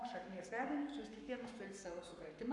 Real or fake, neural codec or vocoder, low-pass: fake; codec, 44.1 kHz, 7.8 kbps, Pupu-Codec; 10.8 kHz